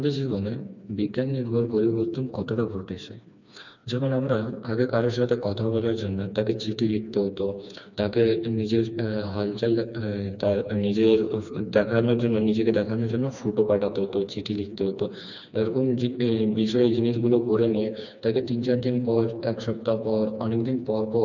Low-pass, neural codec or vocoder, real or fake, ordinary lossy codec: 7.2 kHz; codec, 16 kHz, 2 kbps, FreqCodec, smaller model; fake; none